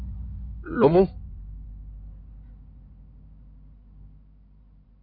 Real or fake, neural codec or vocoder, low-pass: fake; codec, 16 kHz in and 24 kHz out, 2.2 kbps, FireRedTTS-2 codec; 5.4 kHz